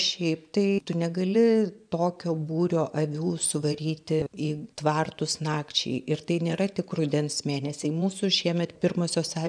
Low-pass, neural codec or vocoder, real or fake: 9.9 kHz; vocoder, 24 kHz, 100 mel bands, Vocos; fake